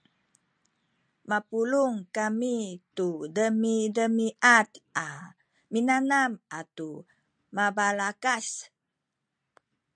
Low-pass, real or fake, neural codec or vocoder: 9.9 kHz; real; none